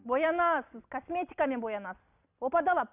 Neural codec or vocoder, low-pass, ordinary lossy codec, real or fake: none; 3.6 kHz; none; real